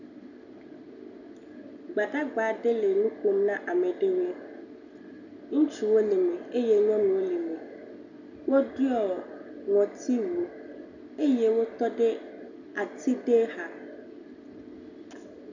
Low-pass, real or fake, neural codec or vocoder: 7.2 kHz; real; none